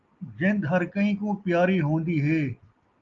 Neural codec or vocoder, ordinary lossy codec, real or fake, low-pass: none; Opus, 32 kbps; real; 7.2 kHz